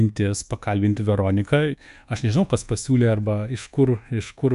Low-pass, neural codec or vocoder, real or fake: 10.8 kHz; codec, 24 kHz, 1.2 kbps, DualCodec; fake